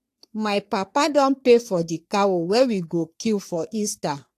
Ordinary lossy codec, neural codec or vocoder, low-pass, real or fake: AAC, 64 kbps; codec, 44.1 kHz, 3.4 kbps, Pupu-Codec; 14.4 kHz; fake